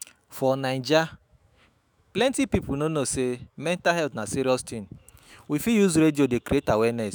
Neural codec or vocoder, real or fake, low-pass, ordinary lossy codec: autoencoder, 48 kHz, 128 numbers a frame, DAC-VAE, trained on Japanese speech; fake; none; none